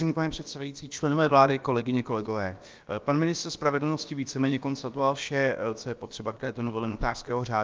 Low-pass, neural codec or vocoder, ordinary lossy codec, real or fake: 7.2 kHz; codec, 16 kHz, about 1 kbps, DyCAST, with the encoder's durations; Opus, 24 kbps; fake